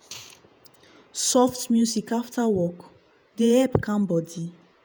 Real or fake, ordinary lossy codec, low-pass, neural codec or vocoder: fake; none; none; vocoder, 48 kHz, 128 mel bands, Vocos